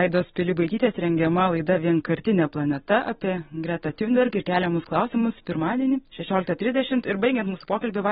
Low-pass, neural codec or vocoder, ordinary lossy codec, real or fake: 10.8 kHz; none; AAC, 16 kbps; real